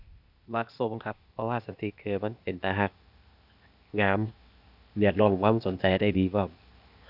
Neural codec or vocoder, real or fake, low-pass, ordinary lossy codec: codec, 16 kHz, 0.8 kbps, ZipCodec; fake; 5.4 kHz; none